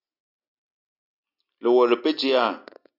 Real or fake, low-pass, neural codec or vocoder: real; 5.4 kHz; none